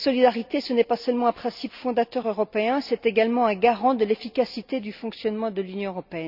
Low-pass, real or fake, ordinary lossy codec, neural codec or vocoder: 5.4 kHz; real; none; none